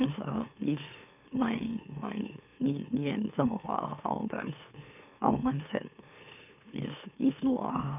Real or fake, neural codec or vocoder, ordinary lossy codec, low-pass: fake; autoencoder, 44.1 kHz, a latent of 192 numbers a frame, MeloTTS; none; 3.6 kHz